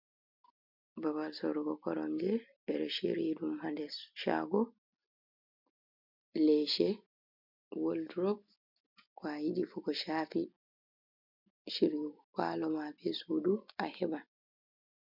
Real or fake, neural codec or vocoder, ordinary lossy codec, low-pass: real; none; MP3, 48 kbps; 5.4 kHz